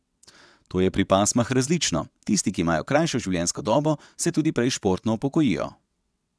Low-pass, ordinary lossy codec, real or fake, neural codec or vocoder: none; none; fake; vocoder, 22.05 kHz, 80 mel bands, WaveNeXt